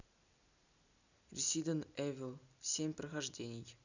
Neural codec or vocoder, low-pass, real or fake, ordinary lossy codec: none; 7.2 kHz; real; none